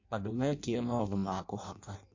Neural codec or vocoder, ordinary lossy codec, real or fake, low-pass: codec, 16 kHz in and 24 kHz out, 0.6 kbps, FireRedTTS-2 codec; MP3, 48 kbps; fake; 7.2 kHz